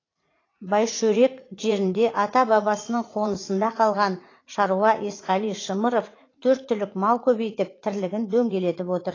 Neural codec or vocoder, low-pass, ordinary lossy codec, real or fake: vocoder, 44.1 kHz, 80 mel bands, Vocos; 7.2 kHz; AAC, 32 kbps; fake